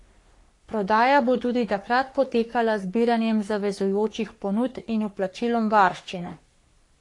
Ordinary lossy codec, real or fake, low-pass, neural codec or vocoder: AAC, 48 kbps; fake; 10.8 kHz; codec, 44.1 kHz, 3.4 kbps, Pupu-Codec